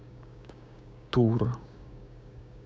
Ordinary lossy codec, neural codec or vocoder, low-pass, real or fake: none; codec, 16 kHz, 6 kbps, DAC; none; fake